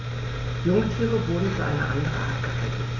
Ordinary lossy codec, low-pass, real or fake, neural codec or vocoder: AAC, 48 kbps; 7.2 kHz; real; none